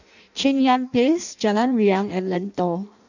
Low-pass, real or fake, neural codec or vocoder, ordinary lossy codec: 7.2 kHz; fake; codec, 16 kHz in and 24 kHz out, 0.6 kbps, FireRedTTS-2 codec; none